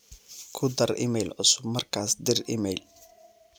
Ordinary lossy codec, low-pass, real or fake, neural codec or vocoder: none; none; real; none